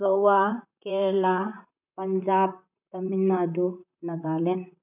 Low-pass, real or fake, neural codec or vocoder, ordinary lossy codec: 3.6 kHz; fake; vocoder, 44.1 kHz, 128 mel bands, Pupu-Vocoder; none